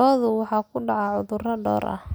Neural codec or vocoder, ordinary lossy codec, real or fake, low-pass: none; none; real; none